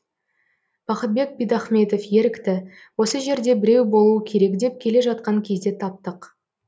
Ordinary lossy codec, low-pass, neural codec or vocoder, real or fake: none; none; none; real